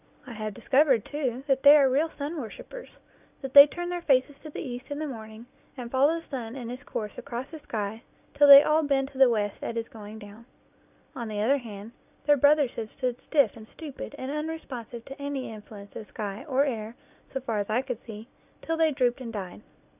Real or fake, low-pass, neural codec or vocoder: real; 3.6 kHz; none